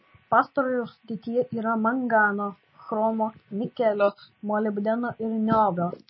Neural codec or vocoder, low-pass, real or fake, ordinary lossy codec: none; 7.2 kHz; real; MP3, 24 kbps